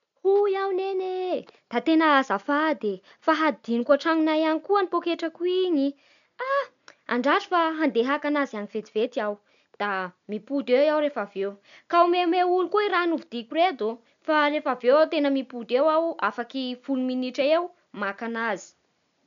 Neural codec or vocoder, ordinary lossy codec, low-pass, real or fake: none; none; 7.2 kHz; real